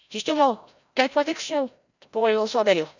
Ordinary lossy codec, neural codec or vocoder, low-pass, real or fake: none; codec, 16 kHz, 0.5 kbps, FreqCodec, larger model; 7.2 kHz; fake